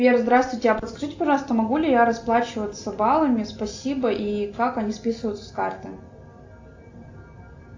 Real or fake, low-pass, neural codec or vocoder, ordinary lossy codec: real; 7.2 kHz; none; AAC, 48 kbps